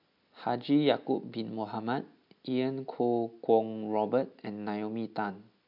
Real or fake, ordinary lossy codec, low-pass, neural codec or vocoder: real; MP3, 48 kbps; 5.4 kHz; none